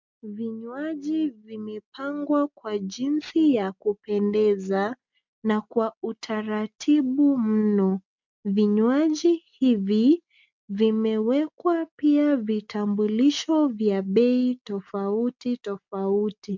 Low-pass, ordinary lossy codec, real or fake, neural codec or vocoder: 7.2 kHz; MP3, 64 kbps; real; none